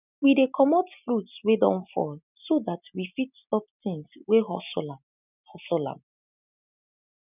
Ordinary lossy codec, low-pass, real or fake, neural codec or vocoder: none; 3.6 kHz; real; none